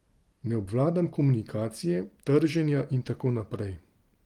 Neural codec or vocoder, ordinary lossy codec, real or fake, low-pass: vocoder, 44.1 kHz, 128 mel bands every 512 samples, BigVGAN v2; Opus, 24 kbps; fake; 19.8 kHz